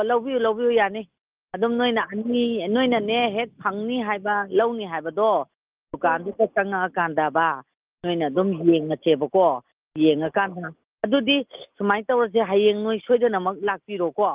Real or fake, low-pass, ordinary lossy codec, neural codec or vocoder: real; 3.6 kHz; Opus, 32 kbps; none